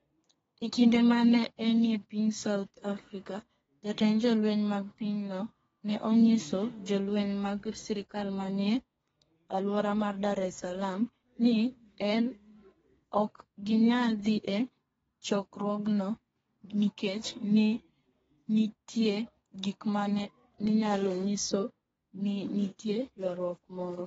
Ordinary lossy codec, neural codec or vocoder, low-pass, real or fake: AAC, 24 kbps; codec, 32 kHz, 1.9 kbps, SNAC; 14.4 kHz; fake